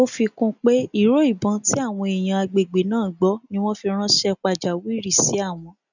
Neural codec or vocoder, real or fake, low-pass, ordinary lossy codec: none; real; 7.2 kHz; none